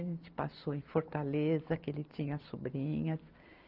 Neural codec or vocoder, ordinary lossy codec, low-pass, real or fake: none; Opus, 24 kbps; 5.4 kHz; real